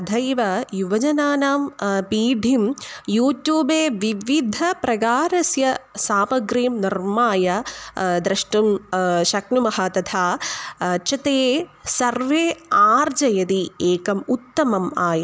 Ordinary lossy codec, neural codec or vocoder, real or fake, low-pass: none; none; real; none